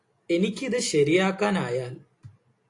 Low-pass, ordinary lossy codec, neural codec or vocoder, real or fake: 10.8 kHz; AAC, 48 kbps; none; real